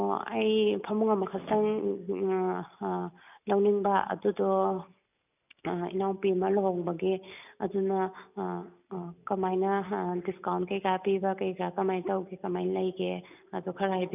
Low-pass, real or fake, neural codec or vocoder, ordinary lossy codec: 3.6 kHz; real; none; none